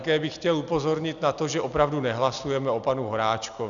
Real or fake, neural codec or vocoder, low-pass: real; none; 7.2 kHz